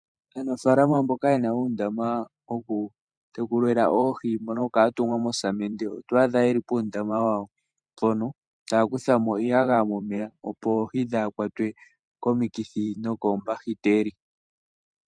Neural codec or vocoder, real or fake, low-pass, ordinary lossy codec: vocoder, 44.1 kHz, 128 mel bands every 512 samples, BigVGAN v2; fake; 9.9 kHz; MP3, 96 kbps